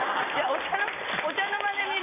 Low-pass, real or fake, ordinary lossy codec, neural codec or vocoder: 3.6 kHz; real; none; none